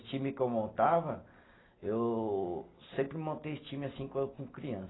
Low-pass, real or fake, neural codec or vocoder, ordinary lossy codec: 7.2 kHz; real; none; AAC, 16 kbps